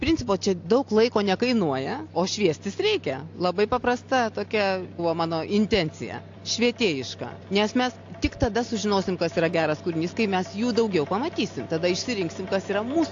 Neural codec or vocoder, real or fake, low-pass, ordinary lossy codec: none; real; 7.2 kHz; AAC, 48 kbps